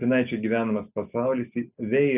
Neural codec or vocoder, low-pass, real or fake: none; 3.6 kHz; real